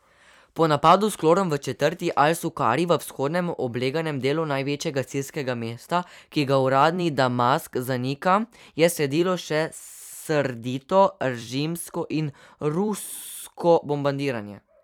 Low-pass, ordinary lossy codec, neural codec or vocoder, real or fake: 19.8 kHz; none; vocoder, 44.1 kHz, 128 mel bands every 256 samples, BigVGAN v2; fake